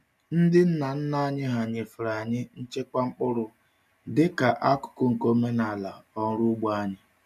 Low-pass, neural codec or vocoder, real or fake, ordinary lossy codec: 14.4 kHz; none; real; none